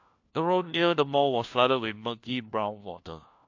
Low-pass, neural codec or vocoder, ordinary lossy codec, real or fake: 7.2 kHz; codec, 16 kHz, 1 kbps, FunCodec, trained on LibriTTS, 50 frames a second; AAC, 48 kbps; fake